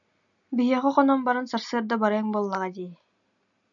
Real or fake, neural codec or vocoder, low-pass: real; none; 7.2 kHz